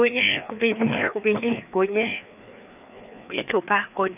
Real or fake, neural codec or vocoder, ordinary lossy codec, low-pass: fake; codec, 16 kHz, 2 kbps, FreqCodec, larger model; none; 3.6 kHz